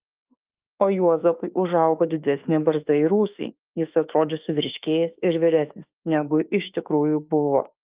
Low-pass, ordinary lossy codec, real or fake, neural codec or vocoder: 3.6 kHz; Opus, 32 kbps; fake; autoencoder, 48 kHz, 32 numbers a frame, DAC-VAE, trained on Japanese speech